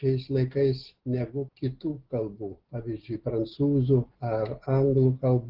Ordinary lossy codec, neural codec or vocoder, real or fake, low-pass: Opus, 16 kbps; none; real; 5.4 kHz